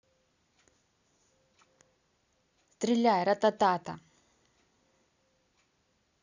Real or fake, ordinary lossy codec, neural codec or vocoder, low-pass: real; none; none; 7.2 kHz